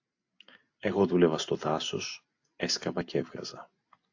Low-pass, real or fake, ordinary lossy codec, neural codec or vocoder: 7.2 kHz; real; AAC, 48 kbps; none